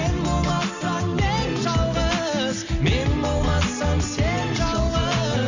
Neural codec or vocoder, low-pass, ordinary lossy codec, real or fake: none; 7.2 kHz; Opus, 64 kbps; real